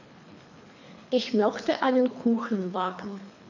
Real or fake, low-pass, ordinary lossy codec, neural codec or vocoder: fake; 7.2 kHz; none; codec, 24 kHz, 3 kbps, HILCodec